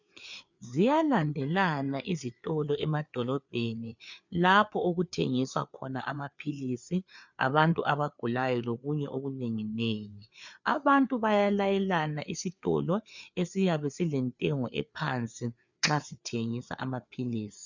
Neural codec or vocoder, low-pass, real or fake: codec, 16 kHz, 4 kbps, FreqCodec, larger model; 7.2 kHz; fake